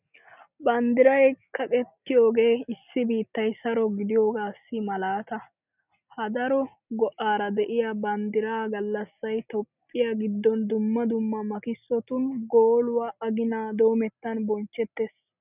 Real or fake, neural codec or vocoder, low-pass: real; none; 3.6 kHz